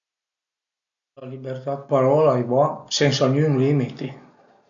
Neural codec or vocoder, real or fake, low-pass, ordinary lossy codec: none; real; 7.2 kHz; none